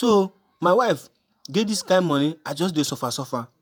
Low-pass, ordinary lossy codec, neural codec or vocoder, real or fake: none; none; vocoder, 48 kHz, 128 mel bands, Vocos; fake